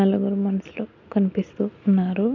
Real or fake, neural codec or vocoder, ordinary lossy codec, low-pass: real; none; none; 7.2 kHz